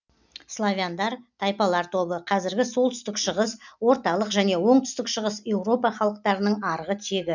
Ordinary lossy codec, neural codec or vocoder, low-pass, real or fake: none; none; 7.2 kHz; real